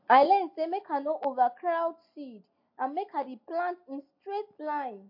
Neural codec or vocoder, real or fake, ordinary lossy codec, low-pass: none; real; MP3, 32 kbps; 5.4 kHz